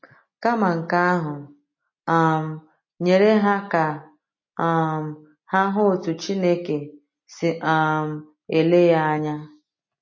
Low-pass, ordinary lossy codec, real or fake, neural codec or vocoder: 7.2 kHz; MP3, 32 kbps; real; none